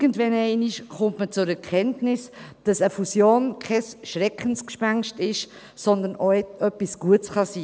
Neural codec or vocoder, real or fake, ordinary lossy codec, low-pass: none; real; none; none